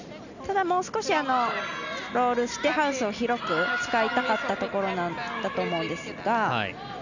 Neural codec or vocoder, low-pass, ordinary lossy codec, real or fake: none; 7.2 kHz; none; real